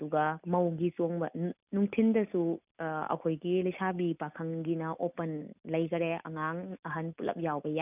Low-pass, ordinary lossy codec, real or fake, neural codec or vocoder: 3.6 kHz; none; real; none